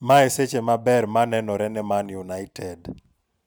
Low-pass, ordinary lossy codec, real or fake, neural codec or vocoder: none; none; real; none